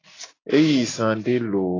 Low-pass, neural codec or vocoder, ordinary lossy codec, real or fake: 7.2 kHz; none; AAC, 32 kbps; real